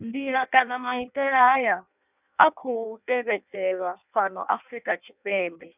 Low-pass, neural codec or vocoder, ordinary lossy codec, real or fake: 3.6 kHz; codec, 16 kHz in and 24 kHz out, 0.6 kbps, FireRedTTS-2 codec; none; fake